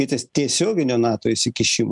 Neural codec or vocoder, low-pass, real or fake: none; 10.8 kHz; real